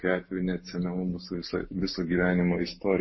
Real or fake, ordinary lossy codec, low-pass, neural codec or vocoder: real; MP3, 24 kbps; 7.2 kHz; none